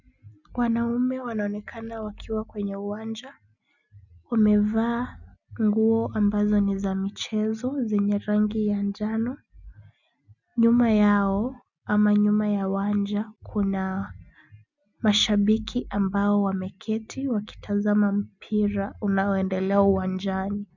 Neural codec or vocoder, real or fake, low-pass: none; real; 7.2 kHz